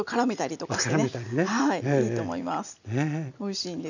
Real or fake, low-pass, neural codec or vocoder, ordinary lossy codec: real; 7.2 kHz; none; none